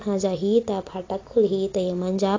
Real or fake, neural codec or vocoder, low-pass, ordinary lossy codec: real; none; 7.2 kHz; AAC, 32 kbps